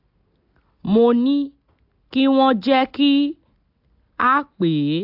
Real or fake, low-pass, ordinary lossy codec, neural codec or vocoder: real; 5.4 kHz; AAC, 48 kbps; none